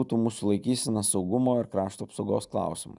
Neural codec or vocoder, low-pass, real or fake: none; 10.8 kHz; real